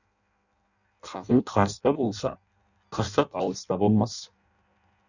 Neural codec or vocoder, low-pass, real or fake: codec, 16 kHz in and 24 kHz out, 0.6 kbps, FireRedTTS-2 codec; 7.2 kHz; fake